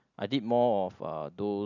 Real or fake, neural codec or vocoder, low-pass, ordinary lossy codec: real; none; 7.2 kHz; none